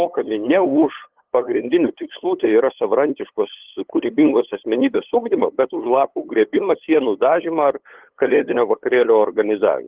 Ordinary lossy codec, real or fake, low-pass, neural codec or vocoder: Opus, 16 kbps; fake; 3.6 kHz; codec, 16 kHz, 8 kbps, FunCodec, trained on LibriTTS, 25 frames a second